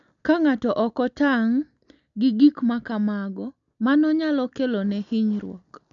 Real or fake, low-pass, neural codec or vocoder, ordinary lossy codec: real; 7.2 kHz; none; none